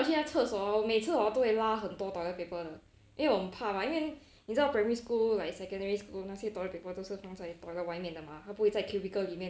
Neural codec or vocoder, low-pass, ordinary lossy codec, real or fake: none; none; none; real